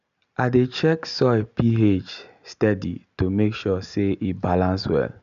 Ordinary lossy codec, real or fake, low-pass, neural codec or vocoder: none; real; 7.2 kHz; none